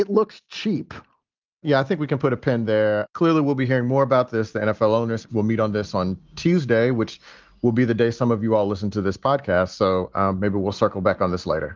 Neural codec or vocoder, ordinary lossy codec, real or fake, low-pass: none; Opus, 24 kbps; real; 7.2 kHz